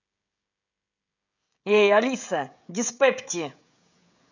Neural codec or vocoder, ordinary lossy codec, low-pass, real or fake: codec, 16 kHz, 16 kbps, FreqCodec, smaller model; none; 7.2 kHz; fake